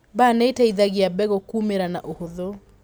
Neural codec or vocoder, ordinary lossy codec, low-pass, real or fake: none; none; none; real